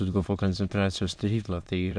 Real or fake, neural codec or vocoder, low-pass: fake; autoencoder, 22.05 kHz, a latent of 192 numbers a frame, VITS, trained on many speakers; 9.9 kHz